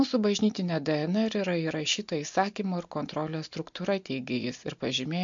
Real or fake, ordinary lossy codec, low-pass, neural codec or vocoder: real; MP3, 48 kbps; 7.2 kHz; none